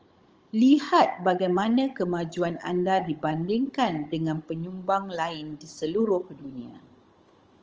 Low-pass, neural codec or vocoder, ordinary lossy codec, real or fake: 7.2 kHz; codec, 16 kHz, 16 kbps, FunCodec, trained on Chinese and English, 50 frames a second; Opus, 24 kbps; fake